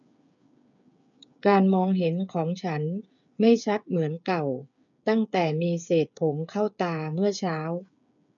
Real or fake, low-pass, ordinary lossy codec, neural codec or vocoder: fake; 7.2 kHz; none; codec, 16 kHz, 8 kbps, FreqCodec, smaller model